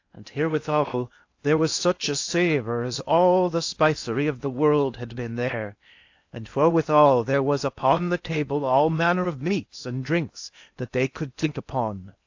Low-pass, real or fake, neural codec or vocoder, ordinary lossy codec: 7.2 kHz; fake; codec, 16 kHz in and 24 kHz out, 0.8 kbps, FocalCodec, streaming, 65536 codes; AAC, 48 kbps